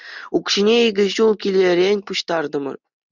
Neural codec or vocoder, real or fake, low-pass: none; real; 7.2 kHz